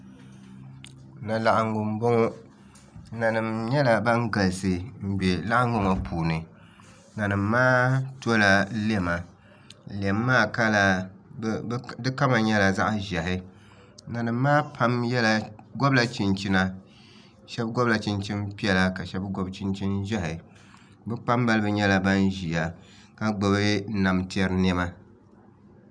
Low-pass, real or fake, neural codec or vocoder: 9.9 kHz; real; none